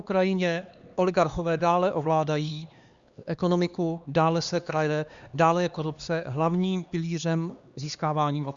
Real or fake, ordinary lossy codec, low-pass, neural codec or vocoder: fake; Opus, 64 kbps; 7.2 kHz; codec, 16 kHz, 2 kbps, X-Codec, HuBERT features, trained on LibriSpeech